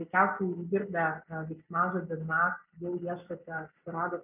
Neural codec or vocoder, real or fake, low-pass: none; real; 3.6 kHz